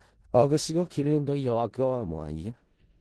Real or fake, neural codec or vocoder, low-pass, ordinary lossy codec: fake; codec, 16 kHz in and 24 kHz out, 0.4 kbps, LongCat-Audio-Codec, four codebook decoder; 10.8 kHz; Opus, 16 kbps